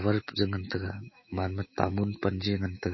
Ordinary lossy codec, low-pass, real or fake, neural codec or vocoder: MP3, 24 kbps; 7.2 kHz; fake; autoencoder, 48 kHz, 128 numbers a frame, DAC-VAE, trained on Japanese speech